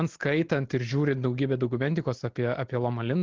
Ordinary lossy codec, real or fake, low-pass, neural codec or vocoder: Opus, 16 kbps; real; 7.2 kHz; none